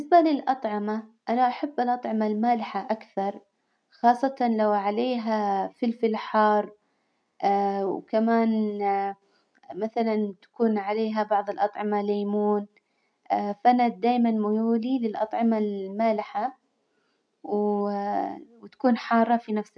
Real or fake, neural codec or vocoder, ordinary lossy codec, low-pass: real; none; MP3, 64 kbps; 9.9 kHz